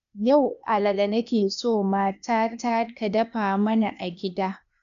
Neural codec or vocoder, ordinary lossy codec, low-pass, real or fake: codec, 16 kHz, 0.8 kbps, ZipCodec; none; 7.2 kHz; fake